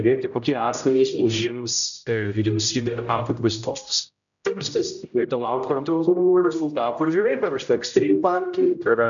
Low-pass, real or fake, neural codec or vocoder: 7.2 kHz; fake; codec, 16 kHz, 0.5 kbps, X-Codec, HuBERT features, trained on general audio